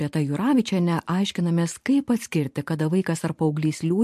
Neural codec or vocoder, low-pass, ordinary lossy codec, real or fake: vocoder, 44.1 kHz, 128 mel bands every 512 samples, BigVGAN v2; 14.4 kHz; MP3, 64 kbps; fake